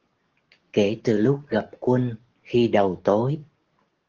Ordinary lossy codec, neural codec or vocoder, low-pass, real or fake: Opus, 16 kbps; codec, 44.1 kHz, 7.8 kbps, DAC; 7.2 kHz; fake